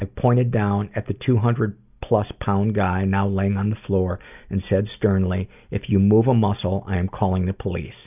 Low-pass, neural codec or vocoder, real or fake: 3.6 kHz; none; real